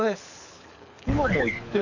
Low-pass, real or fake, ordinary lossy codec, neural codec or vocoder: 7.2 kHz; fake; none; codec, 24 kHz, 6 kbps, HILCodec